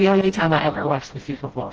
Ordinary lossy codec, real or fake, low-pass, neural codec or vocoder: Opus, 16 kbps; fake; 7.2 kHz; codec, 16 kHz, 0.5 kbps, FreqCodec, smaller model